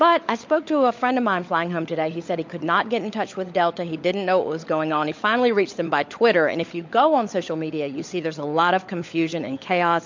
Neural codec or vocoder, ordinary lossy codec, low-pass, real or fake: codec, 16 kHz, 16 kbps, FunCodec, trained on LibriTTS, 50 frames a second; MP3, 48 kbps; 7.2 kHz; fake